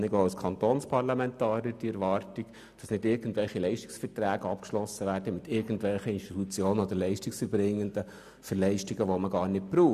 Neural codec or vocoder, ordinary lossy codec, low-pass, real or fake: none; none; 14.4 kHz; real